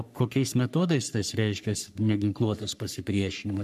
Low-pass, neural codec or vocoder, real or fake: 14.4 kHz; codec, 44.1 kHz, 3.4 kbps, Pupu-Codec; fake